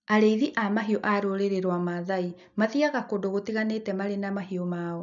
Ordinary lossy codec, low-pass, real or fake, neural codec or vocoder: none; 7.2 kHz; real; none